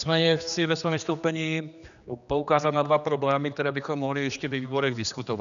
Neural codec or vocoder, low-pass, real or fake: codec, 16 kHz, 2 kbps, X-Codec, HuBERT features, trained on general audio; 7.2 kHz; fake